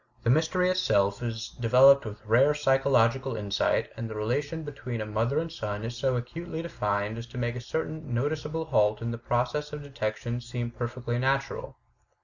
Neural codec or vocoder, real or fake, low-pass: none; real; 7.2 kHz